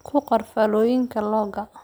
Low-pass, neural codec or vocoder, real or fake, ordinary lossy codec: none; none; real; none